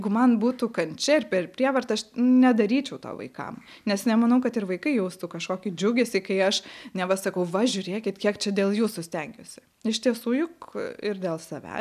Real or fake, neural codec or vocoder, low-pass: real; none; 14.4 kHz